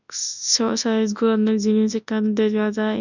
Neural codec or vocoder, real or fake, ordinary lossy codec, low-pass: codec, 24 kHz, 0.9 kbps, WavTokenizer, large speech release; fake; none; 7.2 kHz